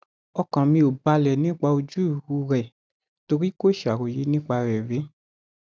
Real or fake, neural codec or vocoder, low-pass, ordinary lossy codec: real; none; none; none